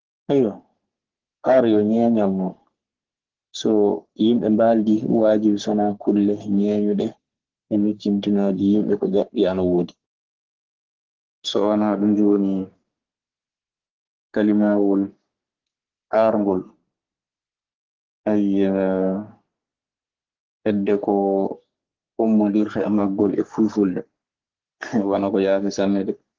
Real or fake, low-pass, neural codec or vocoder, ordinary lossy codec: fake; 7.2 kHz; codec, 44.1 kHz, 3.4 kbps, Pupu-Codec; Opus, 16 kbps